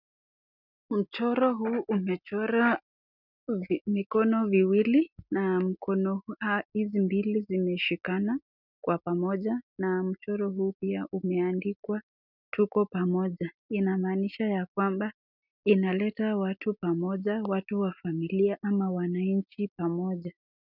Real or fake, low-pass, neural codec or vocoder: real; 5.4 kHz; none